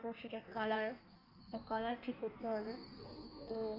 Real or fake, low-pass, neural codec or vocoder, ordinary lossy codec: fake; 5.4 kHz; codec, 16 kHz in and 24 kHz out, 1.1 kbps, FireRedTTS-2 codec; MP3, 48 kbps